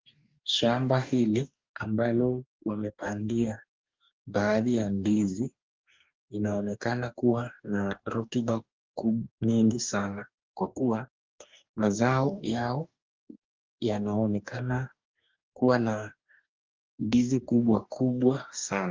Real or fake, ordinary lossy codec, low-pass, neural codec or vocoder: fake; Opus, 32 kbps; 7.2 kHz; codec, 44.1 kHz, 2.6 kbps, DAC